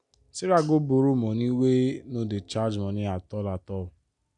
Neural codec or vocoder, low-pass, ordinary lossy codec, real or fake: none; 10.8 kHz; none; real